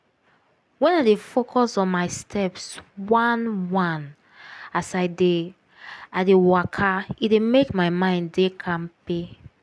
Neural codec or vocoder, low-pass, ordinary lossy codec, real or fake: none; none; none; real